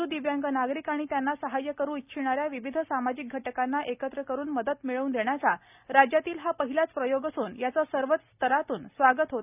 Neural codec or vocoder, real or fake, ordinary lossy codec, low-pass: none; real; none; 3.6 kHz